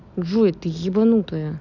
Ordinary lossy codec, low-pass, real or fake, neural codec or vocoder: none; 7.2 kHz; real; none